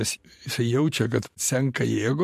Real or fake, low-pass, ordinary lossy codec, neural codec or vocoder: fake; 14.4 kHz; MP3, 64 kbps; vocoder, 44.1 kHz, 128 mel bands every 512 samples, BigVGAN v2